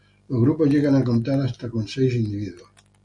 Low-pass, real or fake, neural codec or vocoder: 10.8 kHz; real; none